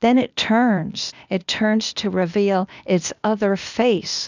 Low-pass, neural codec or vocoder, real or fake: 7.2 kHz; codec, 16 kHz, 0.8 kbps, ZipCodec; fake